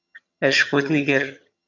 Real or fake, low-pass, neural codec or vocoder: fake; 7.2 kHz; vocoder, 22.05 kHz, 80 mel bands, HiFi-GAN